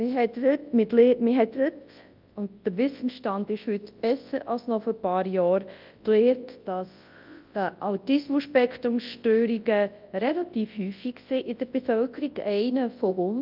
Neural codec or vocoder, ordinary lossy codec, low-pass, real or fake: codec, 24 kHz, 0.9 kbps, WavTokenizer, large speech release; Opus, 24 kbps; 5.4 kHz; fake